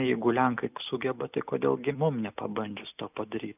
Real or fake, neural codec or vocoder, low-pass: real; none; 3.6 kHz